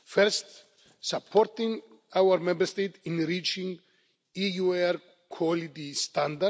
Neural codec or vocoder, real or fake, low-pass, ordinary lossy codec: none; real; none; none